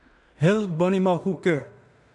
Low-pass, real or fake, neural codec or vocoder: 10.8 kHz; fake; codec, 16 kHz in and 24 kHz out, 0.4 kbps, LongCat-Audio-Codec, two codebook decoder